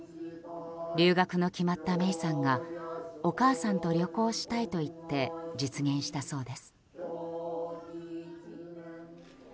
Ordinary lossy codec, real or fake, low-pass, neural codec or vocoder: none; real; none; none